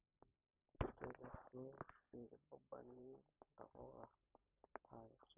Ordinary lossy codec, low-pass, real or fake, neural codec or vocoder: none; 3.6 kHz; real; none